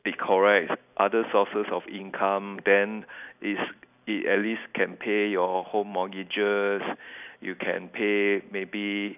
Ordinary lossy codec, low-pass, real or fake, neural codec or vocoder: none; 3.6 kHz; real; none